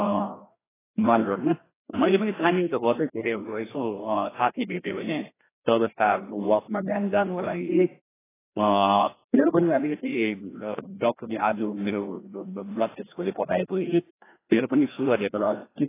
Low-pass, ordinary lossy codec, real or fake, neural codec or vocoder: 3.6 kHz; AAC, 16 kbps; fake; codec, 16 kHz, 1 kbps, FreqCodec, larger model